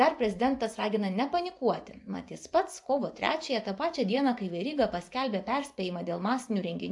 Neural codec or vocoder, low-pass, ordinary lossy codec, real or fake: vocoder, 24 kHz, 100 mel bands, Vocos; 10.8 kHz; AAC, 64 kbps; fake